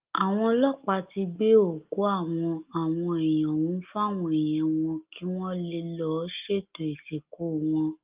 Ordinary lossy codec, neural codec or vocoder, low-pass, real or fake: Opus, 32 kbps; none; 3.6 kHz; real